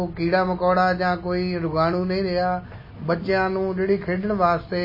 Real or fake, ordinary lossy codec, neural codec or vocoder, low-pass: real; MP3, 24 kbps; none; 5.4 kHz